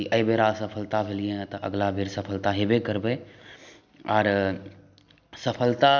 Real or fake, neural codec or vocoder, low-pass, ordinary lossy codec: real; none; 7.2 kHz; none